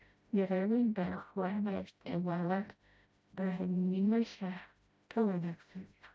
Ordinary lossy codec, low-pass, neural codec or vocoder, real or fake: none; none; codec, 16 kHz, 0.5 kbps, FreqCodec, smaller model; fake